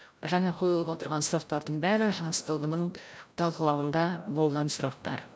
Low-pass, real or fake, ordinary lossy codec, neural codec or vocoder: none; fake; none; codec, 16 kHz, 0.5 kbps, FreqCodec, larger model